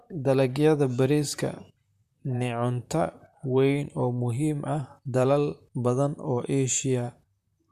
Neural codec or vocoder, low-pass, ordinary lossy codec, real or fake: vocoder, 44.1 kHz, 128 mel bands, Pupu-Vocoder; 14.4 kHz; none; fake